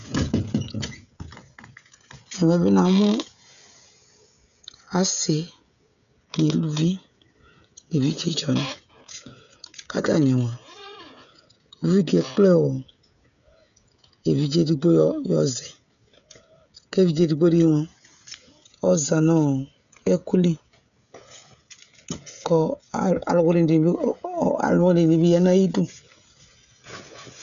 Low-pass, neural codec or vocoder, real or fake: 7.2 kHz; codec, 16 kHz, 16 kbps, FreqCodec, smaller model; fake